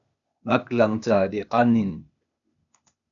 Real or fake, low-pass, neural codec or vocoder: fake; 7.2 kHz; codec, 16 kHz, 0.8 kbps, ZipCodec